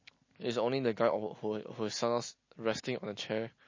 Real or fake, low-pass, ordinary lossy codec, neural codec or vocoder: real; 7.2 kHz; MP3, 32 kbps; none